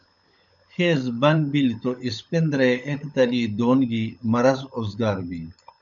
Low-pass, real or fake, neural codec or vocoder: 7.2 kHz; fake; codec, 16 kHz, 16 kbps, FunCodec, trained on LibriTTS, 50 frames a second